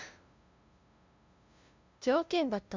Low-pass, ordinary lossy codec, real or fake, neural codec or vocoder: 7.2 kHz; MP3, 64 kbps; fake; codec, 16 kHz, 0.5 kbps, FunCodec, trained on LibriTTS, 25 frames a second